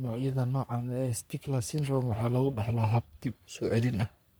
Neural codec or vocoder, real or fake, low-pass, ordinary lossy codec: codec, 44.1 kHz, 3.4 kbps, Pupu-Codec; fake; none; none